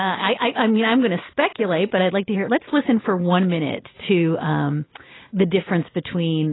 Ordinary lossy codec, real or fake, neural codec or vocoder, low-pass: AAC, 16 kbps; real; none; 7.2 kHz